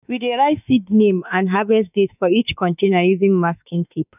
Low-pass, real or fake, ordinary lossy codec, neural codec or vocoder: 3.6 kHz; fake; none; autoencoder, 48 kHz, 32 numbers a frame, DAC-VAE, trained on Japanese speech